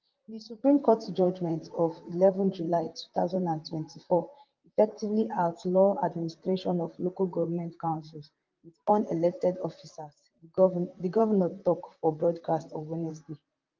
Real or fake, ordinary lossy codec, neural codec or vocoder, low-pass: fake; Opus, 32 kbps; vocoder, 44.1 kHz, 128 mel bands, Pupu-Vocoder; 7.2 kHz